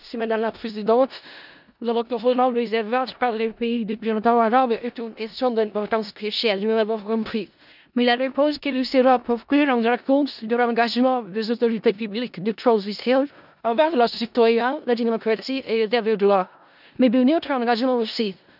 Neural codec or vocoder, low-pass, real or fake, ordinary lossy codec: codec, 16 kHz in and 24 kHz out, 0.4 kbps, LongCat-Audio-Codec, four codebook decoder; 5.4 kHz; fake; none